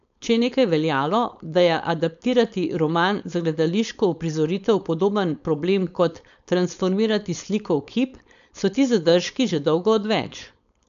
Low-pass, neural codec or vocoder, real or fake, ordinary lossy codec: 7.2 kHz; codec, 16 kHz, 4.8 kbps, FACodec; fake; none